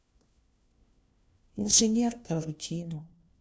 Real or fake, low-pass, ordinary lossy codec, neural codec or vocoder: fake; none; none; codec, 16 kHz, 1 kbps, FunCodec, trained on LibriTTS, 50 frames a second